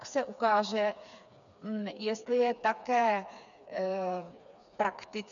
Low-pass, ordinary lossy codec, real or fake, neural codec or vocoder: 7.2 kHz; MP3, 96 kbps; fake; codec, 16 kHz, 4 kbps, FreqCodec, smaller model